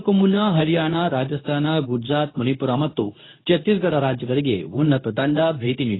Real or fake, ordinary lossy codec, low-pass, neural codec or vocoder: fake; AAC, 16 kbps; 7.2 kHz; codec, 24 kHz, 0.9 kbps, WavTokenizer, medium speech release version 2